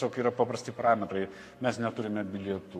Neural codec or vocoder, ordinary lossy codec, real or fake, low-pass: codec, 44.1 kHz, 7.8 kbps, Pupu-Codec; AAC, 64 kbps; fake; 14.4 kHz